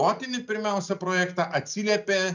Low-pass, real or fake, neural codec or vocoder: 7.2 kHz; real; none